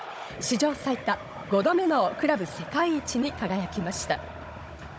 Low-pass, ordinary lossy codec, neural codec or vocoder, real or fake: none; none; codec, 16 kHz, 16 kbps, FunCodec, trained on Chinese and English, 50 frames a second; fake